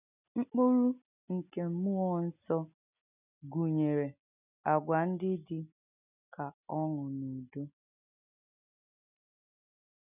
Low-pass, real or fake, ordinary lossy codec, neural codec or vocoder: 3.6 kHz; real; none; none